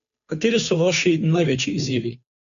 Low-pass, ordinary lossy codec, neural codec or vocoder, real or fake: 7.2 kHz; none; codec, 16 kHz, 2 kbps, FunCodec, trained on Chinese and English, 25 frames a second; fake